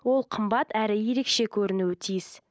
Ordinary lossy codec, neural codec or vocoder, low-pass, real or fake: none; none; none; real